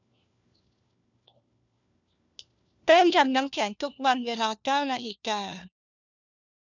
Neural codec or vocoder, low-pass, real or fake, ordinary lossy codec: codec, 16 kHz, 1 kbps, FunCodec, trained on LibriTTS, 50 frames a second; 7.2 kHz; fake; none